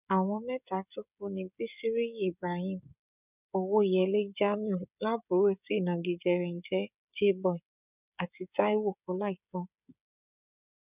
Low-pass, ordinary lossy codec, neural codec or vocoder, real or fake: 3.6 kHz; none; codec, 16 kHz, 16 kbps, FreqCodec, smaller model; fake